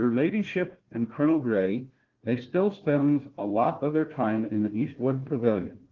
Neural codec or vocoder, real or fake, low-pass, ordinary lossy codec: codec, 16 kHz, 1 kbps, FunCodec, trained on Chinese and English, 50 frames a second; fake; 7.2 kHz; Opus, 16 kbps